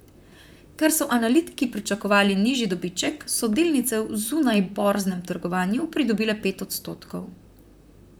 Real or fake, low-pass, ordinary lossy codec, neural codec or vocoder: fake; none; none; vocoder, 44.1 kHz, 128 mel bands, Pupu-Vocoder